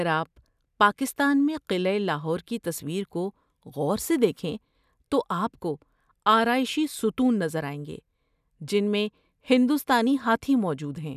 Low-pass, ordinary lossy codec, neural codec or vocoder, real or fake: 14.4 kHz; none; none; real